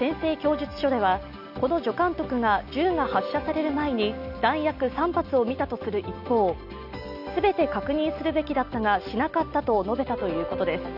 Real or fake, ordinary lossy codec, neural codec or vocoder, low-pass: real; none; none; 5.4 kHz